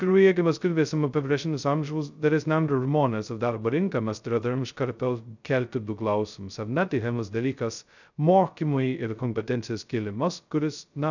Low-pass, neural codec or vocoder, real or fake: 7.2 kHz; codec, 16 kHz, 0.2 kbps, FocalCodec; fake